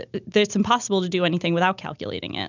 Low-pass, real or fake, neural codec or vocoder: 7.2 kHz; real; none